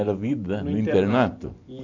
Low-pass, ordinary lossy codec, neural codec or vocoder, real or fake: 7.2 kHz; AAC, 48 kbps; none; real